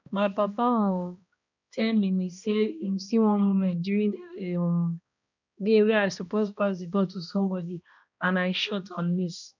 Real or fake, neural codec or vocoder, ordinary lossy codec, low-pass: fake; codec, 16 kHz, 1 kbps, X-Codec, HuBERT features, trained on balanced general audio; none; 7.2 kHz